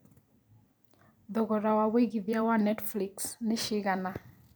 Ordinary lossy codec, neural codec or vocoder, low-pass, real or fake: none; vocoder, 44.1 kHz, 128 mel bands every 256 samples, BigVGAN v2; none; fake